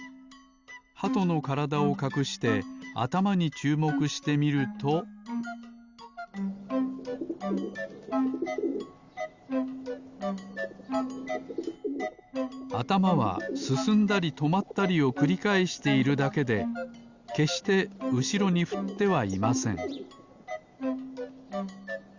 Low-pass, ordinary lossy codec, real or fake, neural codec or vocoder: 7.2 kHz; Opus, 64 kbps; real; none